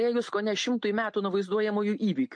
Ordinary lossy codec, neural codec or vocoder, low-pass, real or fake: MP3, 48 kbps; none; 9.9 kHz; real